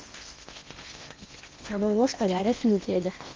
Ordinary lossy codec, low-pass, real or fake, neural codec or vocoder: Opus, 32 kbps; 7.2 kHz; fake; codec, 16 kHz in and 24 kHz out, 0.8 kbps, FocalCodec, streaming, 65536 codes